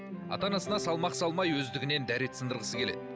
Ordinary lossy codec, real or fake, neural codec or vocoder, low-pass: none; real; none; none